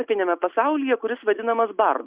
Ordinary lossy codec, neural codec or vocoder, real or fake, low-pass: Opus, 64 kbps; none; real; 3.6 kHz